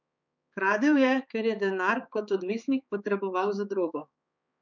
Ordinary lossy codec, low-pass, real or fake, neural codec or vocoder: none; 7.2 kHz; fake; codec, 16 kHz, 4 kbps, X-Codec, HuBERT features, trained on balanced general audio